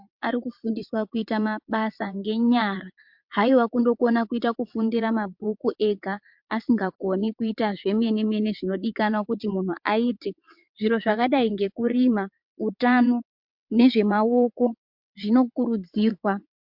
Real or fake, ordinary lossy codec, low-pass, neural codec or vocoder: fake; MP3, 48 kbps; 5.4 kHz; vocoder, 22.05 kHz, 80 mel bands, WaveNeXt